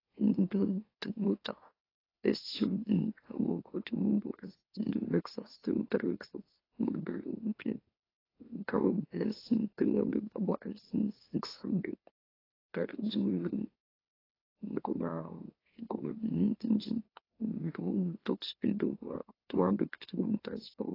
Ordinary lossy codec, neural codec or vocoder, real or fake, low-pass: AAC, 24 kbps; autoencoder, 44.1 kHz, a latent of 192 numbers a frame, MeloTTS; fake; 5.4 kHz